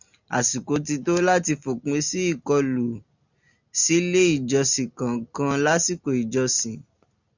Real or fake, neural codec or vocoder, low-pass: real; none; 7.2 kHz